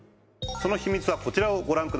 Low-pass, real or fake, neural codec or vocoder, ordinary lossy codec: none; real; none; none